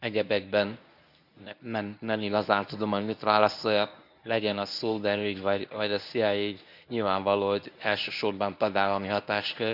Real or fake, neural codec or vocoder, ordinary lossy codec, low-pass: fake; codec, 24 kHz, 0.9 kbps, WavTokenizer, medium speech release version 1; none; 5.4 kHz